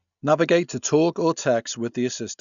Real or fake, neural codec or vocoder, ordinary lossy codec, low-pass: real; none; none; 7.2 kHz